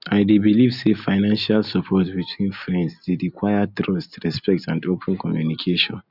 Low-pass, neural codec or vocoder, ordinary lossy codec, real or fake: 5.4 kHz; none; none; real